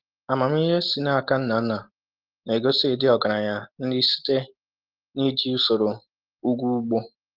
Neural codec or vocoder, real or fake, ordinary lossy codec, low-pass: none; real; Opus, 16 kbps; 5.4 kHz